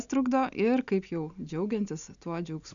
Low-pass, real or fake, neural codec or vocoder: 7.2 kHz; real; none